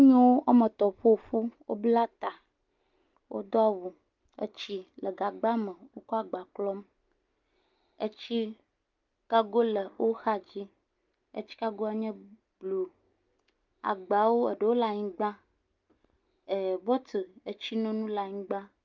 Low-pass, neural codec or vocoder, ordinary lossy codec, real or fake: 7.2 kHz; none; Opus, 24 kbps; real